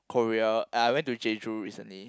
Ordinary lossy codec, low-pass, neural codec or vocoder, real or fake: none; none; none; real